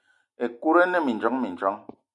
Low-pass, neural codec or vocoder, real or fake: 10.8 kHz; none; real